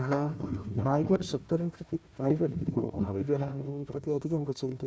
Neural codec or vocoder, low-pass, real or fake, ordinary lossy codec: codec, 16 kHz, 1 kbps, FunCodec, trained on Chinese and English, 50 frames a second; none; fake; none